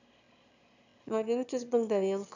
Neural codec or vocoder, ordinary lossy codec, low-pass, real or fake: autoencoder, 22.05 kHz, a latent of 192 numbers a frame, VITS, trained on one speaker; none; 7.2 kHz; fake